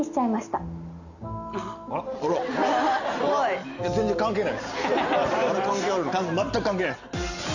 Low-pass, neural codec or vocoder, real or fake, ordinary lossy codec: 7.2 kHz; none; real; none